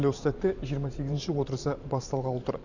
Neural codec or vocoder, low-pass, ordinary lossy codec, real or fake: vocoder, 44.1 kHz, 128 mel bands every 512 samples, BigVGAN v2; 7.2 kHz; none; fake